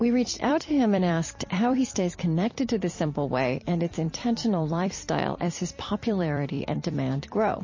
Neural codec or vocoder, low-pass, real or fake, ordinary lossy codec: vocoder, 44.1 kHz, 128 mel bands every 256 samples, BigVGAN v2; 7.2 kHz; fake; MP3, 32 kbps